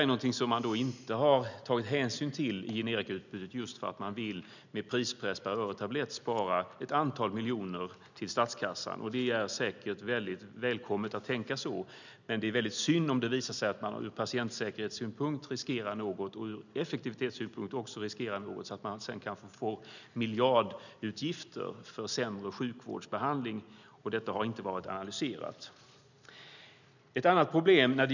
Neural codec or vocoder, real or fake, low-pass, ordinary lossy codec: none; real; 7.2 kHz; none